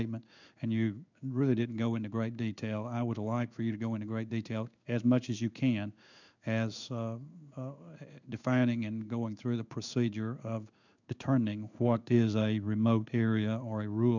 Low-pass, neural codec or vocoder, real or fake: 7.2 kHz; codec, 16 kHz in and 24 kHz out, 1 kbps, XY-Tokenizer; fake